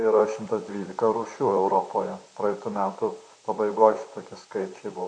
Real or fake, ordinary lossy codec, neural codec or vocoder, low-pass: fake; AAC, 64 kbps; vocoder, 44.1 kHz, 128 mel bands, Pupu-Vocoder; 9.9 kHz